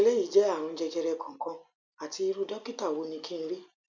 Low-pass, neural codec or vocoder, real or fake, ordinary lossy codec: 7.2 kHz; none; real; none